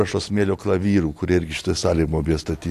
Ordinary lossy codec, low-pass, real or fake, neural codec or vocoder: AAC, 64 kbps; 14.4 kHz; real; none